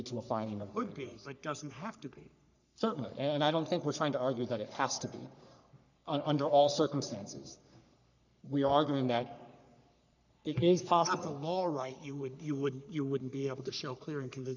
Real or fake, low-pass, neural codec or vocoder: fake; 7.2 kHz; codec, 44.1 kHz, 3.4 kbps, Pupu-Codec